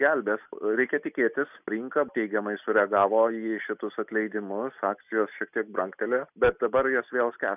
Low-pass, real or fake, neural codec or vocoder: 3.6 kHz; real; none